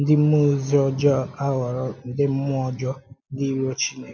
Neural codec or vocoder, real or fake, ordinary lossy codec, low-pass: none; real; none; none